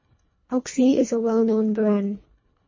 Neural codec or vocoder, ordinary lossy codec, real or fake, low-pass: codec, 24 kHz, 1.5 kbps, HILCodec; MP3, 32 kbps; fake; 7.2 kHz